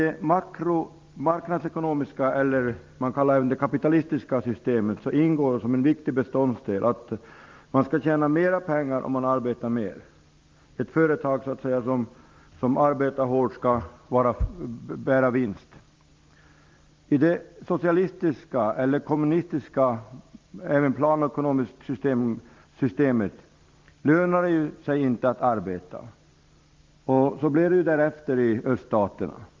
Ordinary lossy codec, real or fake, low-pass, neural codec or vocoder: Opus, 32 kbps; real; 7.2 kHz; none